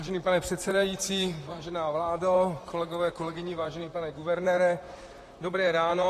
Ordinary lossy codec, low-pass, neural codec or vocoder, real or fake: AAC, 48 kbps; 14.4 kHz; vocoder, 44.1 kHz, 128 mel bands, Pupu-Vocoder; fake